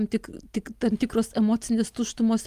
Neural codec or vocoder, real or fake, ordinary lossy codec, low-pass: none; real; Opus, 32 kbps; 14.4 kHz